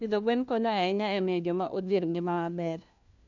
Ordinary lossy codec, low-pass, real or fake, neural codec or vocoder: none; 7.2 kHz; fake; codec, 16 kHz, 1 kbps, FunCodec, trained on LibriTTS, 50 frames a second